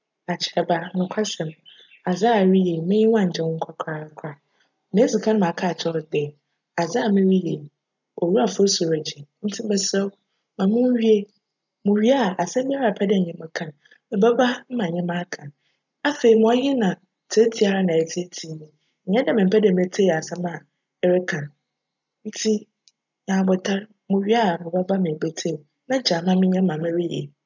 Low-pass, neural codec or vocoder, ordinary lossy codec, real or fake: 7.2 kHz; none; none; real